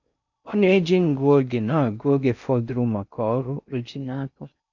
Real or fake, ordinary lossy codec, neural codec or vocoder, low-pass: fake; none; codec, 16 kHz in and 24 kHz out, 0.6 kbps, FocalCodec, streaming, 4096 codes; 7.2 kHz